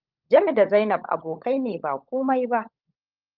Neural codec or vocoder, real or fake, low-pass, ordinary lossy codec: codec, 16 kHz, 16 kbps, FunCodec, trained on LibriTTS, 50 frames a second; fake; 5.4 kHz; Opus, 32 kbps